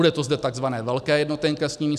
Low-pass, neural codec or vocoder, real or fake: 14.4 kHz; none; real